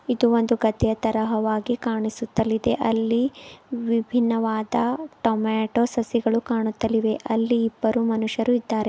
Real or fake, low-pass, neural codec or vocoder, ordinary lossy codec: real; none; none; none